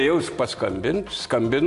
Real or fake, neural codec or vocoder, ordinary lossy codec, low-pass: real; none; AAC, 64 kbps; 10.8 kHz